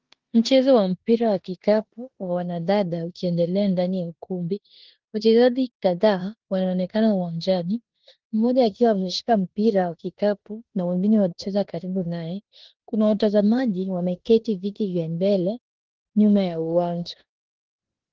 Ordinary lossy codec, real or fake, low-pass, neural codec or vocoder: Opus, 16 kbps; fake; 7.2 kHz; codec, 16 kHz in and 24 kHz out, 0.9 kbps, LongCat-Audio-Codec, four codebook decoder